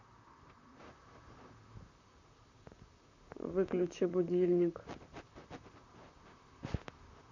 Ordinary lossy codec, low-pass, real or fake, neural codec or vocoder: none; 7.2 kHz; fake; vocoder, 44.1 kHz, 128 mel bands, Pupu-Vocoder